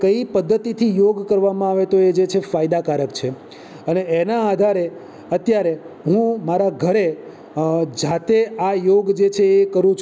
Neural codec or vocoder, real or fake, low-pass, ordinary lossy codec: none; real; none; none